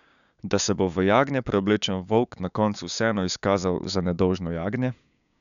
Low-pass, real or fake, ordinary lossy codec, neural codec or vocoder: 7.2 kHz; fake; none; codec, 16 kHz, 6 kbps, DAC